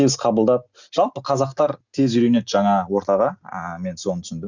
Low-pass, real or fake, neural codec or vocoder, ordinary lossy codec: 7.2 kHz; real; none; Opus, 64 kbps